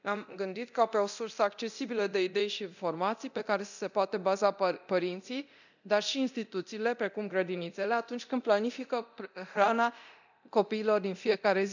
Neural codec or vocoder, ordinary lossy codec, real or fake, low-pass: codec, 24 kHz, 0.9 kbps, DualCodec; none; fake; 7.2 kHz